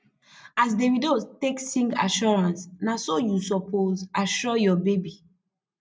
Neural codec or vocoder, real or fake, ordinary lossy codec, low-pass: none; real; none; none